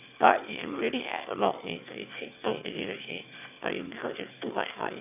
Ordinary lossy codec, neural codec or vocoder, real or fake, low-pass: none; autoencoder, 22.05 kHz, a latent of 192 numbers a frame, VITS, trained on one speaker; fake; 3.6 kHz